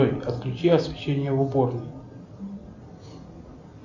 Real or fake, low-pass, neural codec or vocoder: real; 7.2 kHz; none